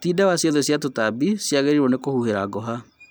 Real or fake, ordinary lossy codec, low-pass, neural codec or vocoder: real; none; none; none